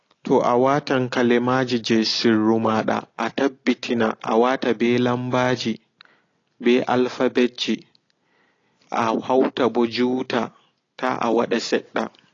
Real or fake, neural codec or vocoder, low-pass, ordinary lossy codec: real; none; 7.2 kHz; AAC, 32 kbps